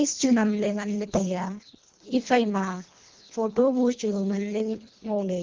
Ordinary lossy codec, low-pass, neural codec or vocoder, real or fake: Opus, 16 kbps; 7.2 kHz; codec, 24 kHz, 1.5 kbps, HILCodec; fake